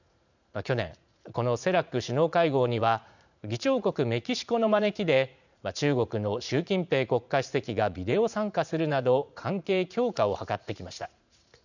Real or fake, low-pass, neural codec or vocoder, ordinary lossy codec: fake; 7.2 kHz; vocoder, 44.1 kHz, 80 mel bands, Vocos; none